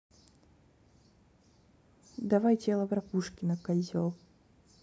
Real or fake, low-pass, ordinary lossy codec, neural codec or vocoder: real; none; none; none